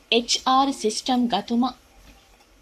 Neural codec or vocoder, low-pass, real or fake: codec, 44.1 kHz, 7.8 kbps, Pupu-Codec; 14.4 kHz; fake